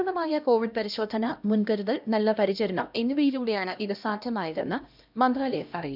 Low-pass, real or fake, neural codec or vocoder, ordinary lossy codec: 5.4 kHz; fake; codec, 16 kHz, 1 kbps, X-Codec, HuBERT features, trained on LibriSpeech; none